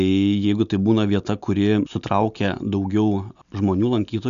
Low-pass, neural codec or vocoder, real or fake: 7.2 kHz; none; real